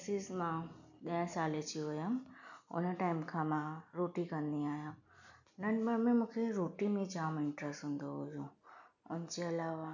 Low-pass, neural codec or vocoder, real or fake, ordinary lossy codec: 7.2 kHz; none; real; none